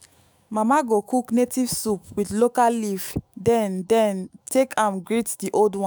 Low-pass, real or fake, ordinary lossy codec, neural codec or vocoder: none; fake; none; autoencoder, 48 kHz, 128 numbers a frame, DAC-VAE, trained on Japanese speech